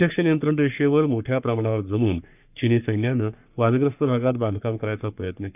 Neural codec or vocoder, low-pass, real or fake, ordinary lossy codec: codec, 44.1 kHz, 3.4 kbps, Pupu-Codec; 3.6 kHz; fake; none